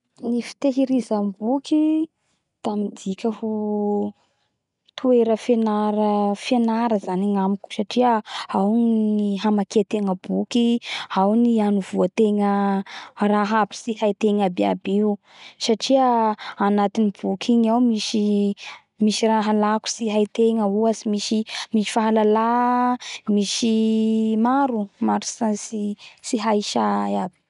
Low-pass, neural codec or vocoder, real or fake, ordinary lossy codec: 10.8 kHz; none; real; none